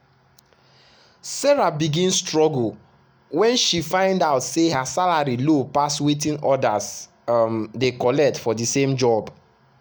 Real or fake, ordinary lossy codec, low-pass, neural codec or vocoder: real; none; none; none